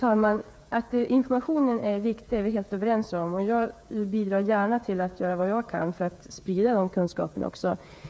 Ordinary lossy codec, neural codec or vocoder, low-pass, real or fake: none; codec, 16 kHz, 8 kbps, FreqCodec, smaller model; none; fake